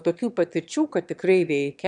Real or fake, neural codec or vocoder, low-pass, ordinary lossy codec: fake; autoencoder, 22.05 kHz, a latent of 192 numbers a frame, VITS, trained on one speaker; 9.9 kHz; MP3, 96 kbps